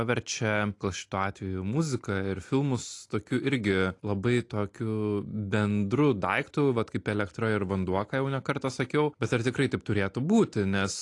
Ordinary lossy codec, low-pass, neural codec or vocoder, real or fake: AAC, 48 kbps; 10.8 kHz; none; real